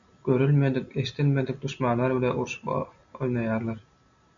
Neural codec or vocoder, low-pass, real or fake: none; 7.2 kHz; real